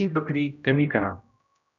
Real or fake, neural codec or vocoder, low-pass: fake; codec, 16 kHz, 0.5 kbps, X-Codec, HuBERT features, trained on general audio; 7.2 kHz